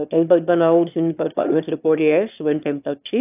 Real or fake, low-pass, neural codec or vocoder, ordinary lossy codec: fake; 3.6 kHz; autoencoder, 22.05 kHz, a latent of 192 numbers a frame, VITS, trained on one speaker; AAC, 32 kbps